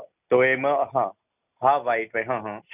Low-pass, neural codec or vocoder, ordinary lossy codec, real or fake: 3.6 kHz; none; none; real